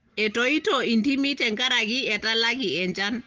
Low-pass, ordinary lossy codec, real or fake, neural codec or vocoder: 7.2 kHz; Opus, 16 kbps; real; none